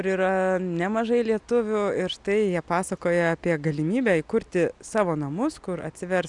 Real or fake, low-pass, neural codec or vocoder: real; 10.8 kHz; none